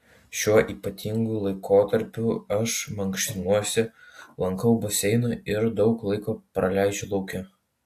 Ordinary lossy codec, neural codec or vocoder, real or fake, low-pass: AAC, 64 kbps; none; real; 14.4 kHz